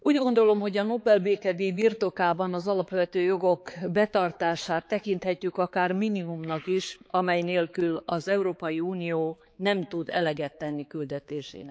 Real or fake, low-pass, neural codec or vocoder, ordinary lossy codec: fake; none; codec, 16 kHz, 4 kbps, X-Codec, HuBERT features, trained on balanced general audio; none